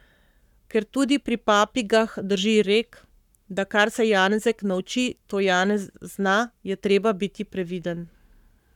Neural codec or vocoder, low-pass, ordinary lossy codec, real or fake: codec, 44.1 kHz, 7.8 kbps, Pupu-Codec; 19.8 kHz; none; fake